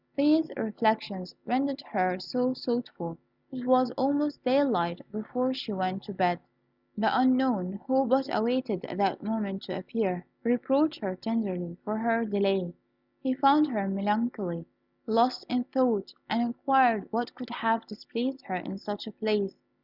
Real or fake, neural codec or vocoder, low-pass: real; none; 5.4 kHz